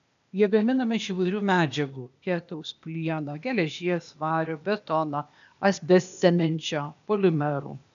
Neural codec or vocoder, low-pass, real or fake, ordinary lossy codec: codec, 16 kHz, 0.8 kbps, ZipCodec; 7.2 kHz; fake; MP3, 96 kbps